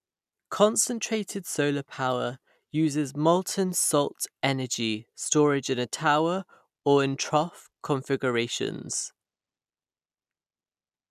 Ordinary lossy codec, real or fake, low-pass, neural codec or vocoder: none; real; 14.4 kHz; none